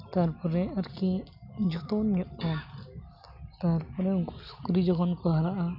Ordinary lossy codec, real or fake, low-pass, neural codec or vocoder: none; real; 5.4 kHz; none